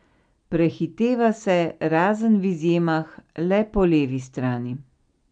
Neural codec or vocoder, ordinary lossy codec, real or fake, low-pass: none; none; real; 9.9 kHz